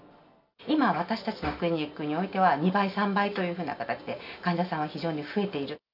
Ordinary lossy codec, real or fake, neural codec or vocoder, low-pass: none; real; none; 5.4 kHz